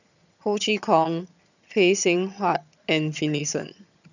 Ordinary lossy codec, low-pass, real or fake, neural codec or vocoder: none; 7.2 kHz; fake; vocoder, 22.05 kHz, 80 mel bands, HiFi-GAN